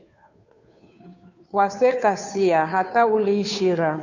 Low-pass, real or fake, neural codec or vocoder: 7.2 kHz; fake; codec, 16 kHz, 2 kbps, FunCodec, trained on Chinese and English, 25 frames a second